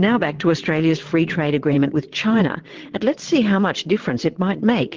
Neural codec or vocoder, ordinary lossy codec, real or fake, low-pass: vocoder, 22.05 kHz, 80 mel bands, WaveNeXt; Opus, 16 kbps; fake; 7.2 kHz